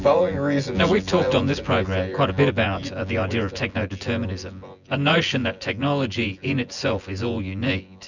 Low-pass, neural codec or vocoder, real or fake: 7.2 kHz; vocoder, 24 kHz, 100 mel bands, Vocos; fake